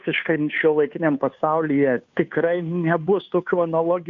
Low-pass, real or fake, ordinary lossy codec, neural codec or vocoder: 7.2 kHz; fake; AAC, 64 kbps; codec, 16 kHz, 2 kbps, FunCodec, trained on Chinese and English, 25 frames a second